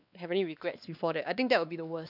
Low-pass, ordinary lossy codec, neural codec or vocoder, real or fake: 5.4 kHz; none; codec, 16 kHz, 2 kbps, X-Codec, WavLM features, trained on Multilingual LibriSpeech; fake